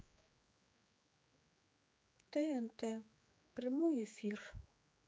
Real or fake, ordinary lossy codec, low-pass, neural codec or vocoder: fake; none; none; codec, 16 kHz, 4 kbps, X-Codec, HuBERT features, trained on general audio